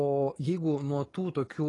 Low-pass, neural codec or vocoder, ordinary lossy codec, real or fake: 10.8 kHz; codec, 44.1 kHz, 7.8 kbps, DAC; AAC, 32 kbps; fake